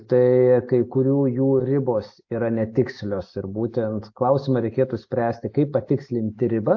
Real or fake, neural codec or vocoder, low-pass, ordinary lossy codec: real; none; 7.2 kHz; AAC, 48 kbps